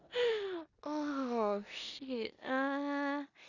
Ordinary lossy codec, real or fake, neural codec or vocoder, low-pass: Opus, 64 kbps; fake; codec, 16 kHz in and 24 kHz out, 0.9 kbps, LongCat-Audio-Codec, four codebook decoder; 7.2 kHz